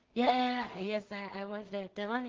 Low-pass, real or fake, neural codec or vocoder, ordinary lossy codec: 7.2 kHz; fake; codec, 16 kHz in and 24 kHz out, 0.4 kbps, LongCat-Audio-Codec, two codebook decoder; Opus, 16 kbps